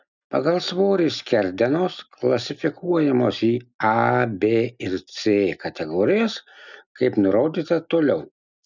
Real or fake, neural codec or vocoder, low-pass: real; none; 7.2 kHz